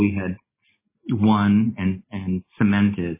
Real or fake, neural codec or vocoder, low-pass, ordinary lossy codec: real; none; 3.6 kHz; MP3, 16 kbps